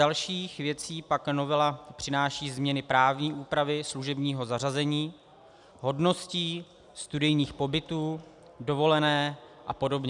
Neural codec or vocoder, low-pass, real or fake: none; 10.8 kHz; real